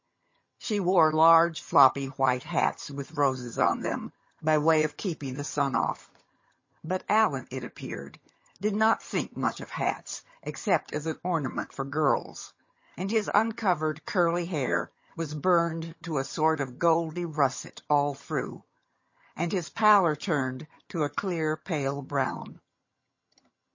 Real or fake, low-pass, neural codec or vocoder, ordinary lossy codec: fake; 7.2 kHz; vocoder, 22.05 kHz, 80 mel bands, HiFi-GAN; MP3, 32 kbps